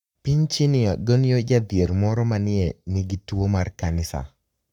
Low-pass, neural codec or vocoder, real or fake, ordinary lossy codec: 19.8 kHz; codec, 44.1 kHz, 7.8 kbps, Pupu-Codec; fake; none